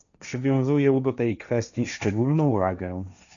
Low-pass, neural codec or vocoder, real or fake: 7.2 kHz; codec, 16 kHz, 1.1 kbps, Voila-Tokenizer; fake